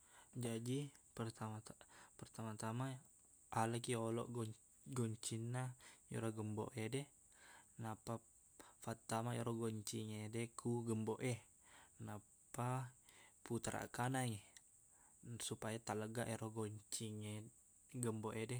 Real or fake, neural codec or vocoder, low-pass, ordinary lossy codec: real; none; none; none